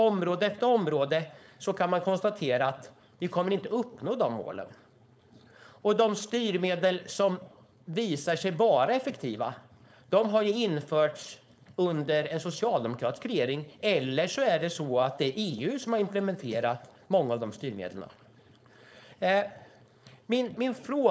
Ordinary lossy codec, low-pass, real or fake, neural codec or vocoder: none; none; fake; codec, 16 kHz, 4.8 kbps, FACodec